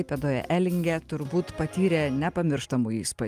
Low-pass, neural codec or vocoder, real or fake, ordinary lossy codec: 14.4 kHz; none; real; Opus, 32 kbps